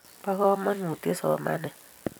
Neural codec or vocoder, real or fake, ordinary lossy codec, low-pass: vocoder, 44.1 kHz, 128 mel bands every 256 samples, BigVGAN v2; fake; none; none